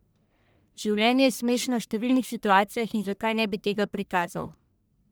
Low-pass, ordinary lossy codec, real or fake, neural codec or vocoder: none; none; fake; codec, 44.1 kHz, 1.7 kbps, Pupu-Codec